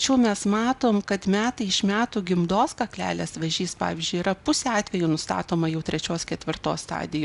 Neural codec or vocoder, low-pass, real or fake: none; 10.8 kHz; real